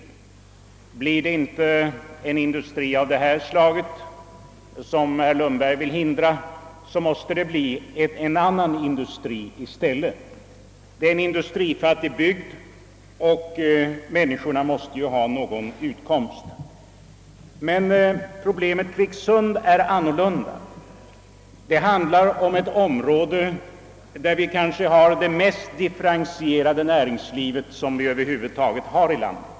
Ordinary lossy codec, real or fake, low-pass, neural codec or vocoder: none; real; none; none